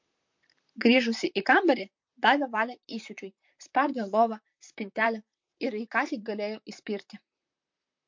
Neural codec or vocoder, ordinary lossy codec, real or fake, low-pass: vocoder, 44.1 kHz, 128 mel bands, Pupu-Vocoder; MP3, 48 kbps; fake; 7.2 kHz